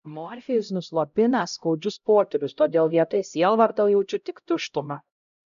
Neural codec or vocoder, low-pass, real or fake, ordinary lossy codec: codec, 16 kHz, 0.5 kbps, X-Codec, HuBERT features, trained on LibriSpeech; 7.2 kHz; fake; MP3, 96 kbps